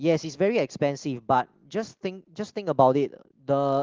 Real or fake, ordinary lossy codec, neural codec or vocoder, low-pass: real; Opus, 16 kbps; none; 7.2 kHz